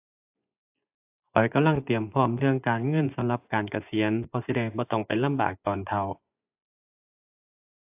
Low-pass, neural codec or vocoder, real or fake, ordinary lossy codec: 3.6 kHz; vocoder, 24 kHz, 100 mel bands, Vocos; fake; AAC, 32 kbps